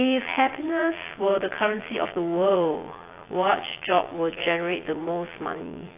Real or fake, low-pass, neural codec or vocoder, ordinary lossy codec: fake; 3.6 kHz; vocoder, 22.05 kHz, 80 mel bands, Vocos; AAC, 24 kbps